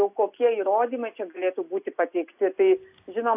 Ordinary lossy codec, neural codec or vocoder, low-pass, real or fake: AAC, 32 kbps; none; 3.6 kHz; real